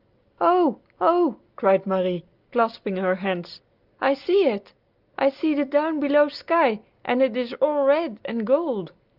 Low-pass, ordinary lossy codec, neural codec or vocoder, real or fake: 5.4 kHz; Opus, 24 kbps; none; real